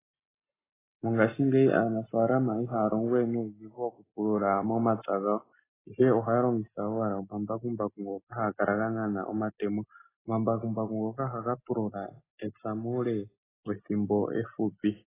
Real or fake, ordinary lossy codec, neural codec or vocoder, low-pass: real; AAC, 16 kbps; none; 3.6 kHz